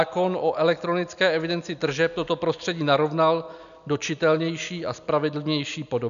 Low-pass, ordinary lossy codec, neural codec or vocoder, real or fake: 7.2 kHz; MP3, 96 kbps; none; real